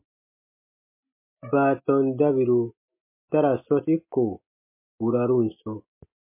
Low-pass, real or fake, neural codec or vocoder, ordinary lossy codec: 3.6 kHz; real; none; MP3, 24 kbps